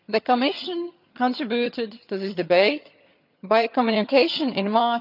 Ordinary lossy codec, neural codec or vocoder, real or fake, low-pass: AAC, 48 kbps; vocoder, 22.05 kHz, 80 mel bands, HiFi-GAN; fake; 5.4 kHz